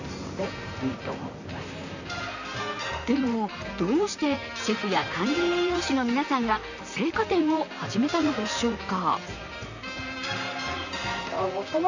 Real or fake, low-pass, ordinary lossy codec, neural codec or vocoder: fake; 7.2 kHz; none; vocoder, 44.1 kHz, 128 mel bands, Pupu-Vocoder